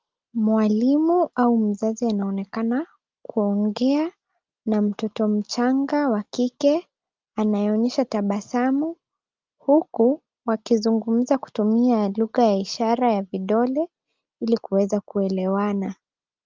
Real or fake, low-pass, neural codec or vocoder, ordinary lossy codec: real; 7.2 kHz; none; Opus, 32 kbps